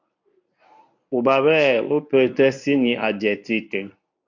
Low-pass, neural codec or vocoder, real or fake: 7.2 kHz; codec, 24 kHz, 0.9 kbps, WavTokenizer, medium speech release version 1; fake